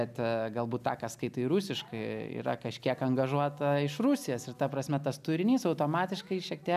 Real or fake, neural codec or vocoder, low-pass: real; none; 14.4 kHz